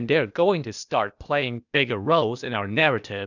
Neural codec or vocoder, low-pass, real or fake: codec, 16 kHz, 0.8 kbps, ZipCodec; 7.2 kHz; fake